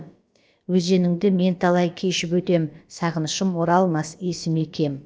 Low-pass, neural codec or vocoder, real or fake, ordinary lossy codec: none; codec, 16 kHz, about 1 kbps, DyCAST, with the encoder's durations; fake; none